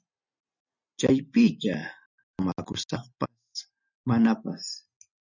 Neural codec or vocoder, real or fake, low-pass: none; real; 7.2 kHz